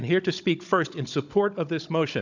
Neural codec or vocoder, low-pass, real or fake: codec, 16 kHz, 16 kbps, FunCodec, trained on Chinese and English, 50 frames a second; 7.2 kHz; fake